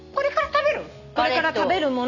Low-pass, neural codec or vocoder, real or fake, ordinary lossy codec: 7.2 kHz; none; real; none